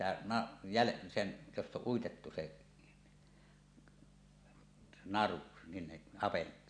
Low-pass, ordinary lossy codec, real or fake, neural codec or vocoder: 9.9 kHz; none; real; none